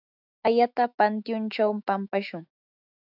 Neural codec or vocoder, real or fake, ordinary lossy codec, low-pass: autoencoder, 48 kHz, 128 numbers a frame, DAC-VAE, trained on Japanese speech; fake; AAC, 48 kbps; 5.4 kHz